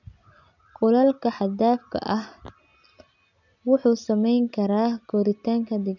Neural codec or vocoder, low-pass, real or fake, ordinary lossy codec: none; 7.2 kHz; real; none